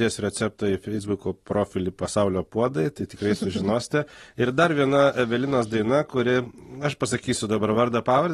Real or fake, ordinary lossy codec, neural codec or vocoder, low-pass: real; AAC, 32 kbps; none; 19.8 kHz